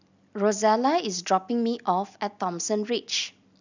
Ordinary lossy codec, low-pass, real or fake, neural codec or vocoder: none; 7.2 kHz; real; none